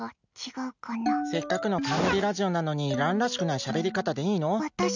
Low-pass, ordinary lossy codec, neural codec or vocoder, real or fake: 7.2 kHz; none; none; real